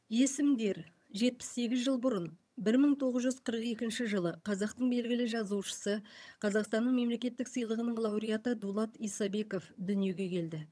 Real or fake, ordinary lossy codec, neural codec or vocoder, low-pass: fake; none; vocoder, 22.05 kHz, 80 mel bands, HiFi-GAN; none